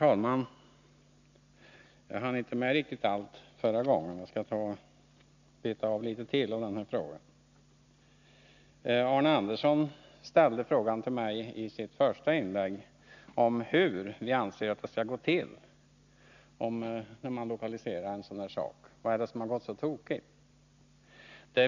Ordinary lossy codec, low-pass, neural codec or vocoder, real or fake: none; 7.2 kHz; none; real